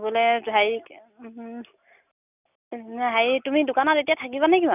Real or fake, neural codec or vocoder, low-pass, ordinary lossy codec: real; none; 3.6 kHz; none